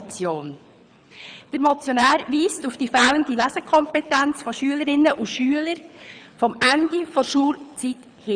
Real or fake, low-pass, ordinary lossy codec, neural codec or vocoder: fake; 9.9 kHz; none; codec, 24 kHz, 6 kbps, HILCodec